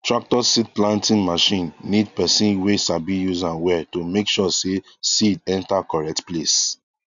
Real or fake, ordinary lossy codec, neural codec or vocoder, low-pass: real; none; none; 7.2 kHz